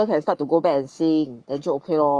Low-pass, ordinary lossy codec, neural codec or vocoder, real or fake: 9.9 kHz; none; codec, 44.1 kHz, 7.8 kbps, Pupu-Codec; fake